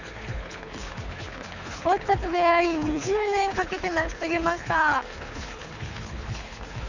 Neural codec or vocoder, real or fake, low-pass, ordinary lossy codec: codec, 24 kHz, 3 kbps, HILCodec; fake; 7.2 kHz; none